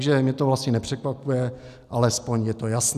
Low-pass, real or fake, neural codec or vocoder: 14.4 kHz; real; none